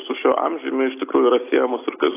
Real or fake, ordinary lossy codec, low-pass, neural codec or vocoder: real; AAC, 16 kbps; 3.6 kHz; none